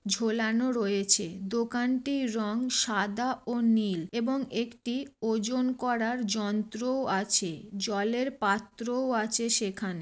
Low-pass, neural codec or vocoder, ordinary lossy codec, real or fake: none; none; none; real